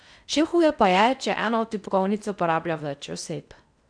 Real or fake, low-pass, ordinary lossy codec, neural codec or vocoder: fake; 9.9 kHz; none; codec, 16 kHz in and 24 kHz out, 0.6 kbps, FocalCodec, streaming, 4096 codes